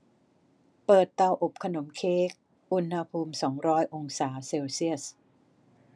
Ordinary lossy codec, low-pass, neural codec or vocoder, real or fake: none; none; none; real